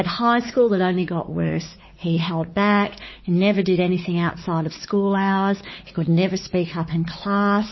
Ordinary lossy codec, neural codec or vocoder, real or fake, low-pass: MP3, 24 kbps; codec, 16 kHz, 4 kbps, X-Codec, WavLM features, trained on Multilingual LibriSpeech; fake; 7.2 kHz